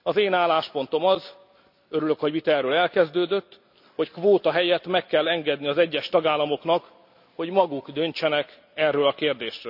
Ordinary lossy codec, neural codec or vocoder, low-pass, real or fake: none; none; 5.4 kHz; real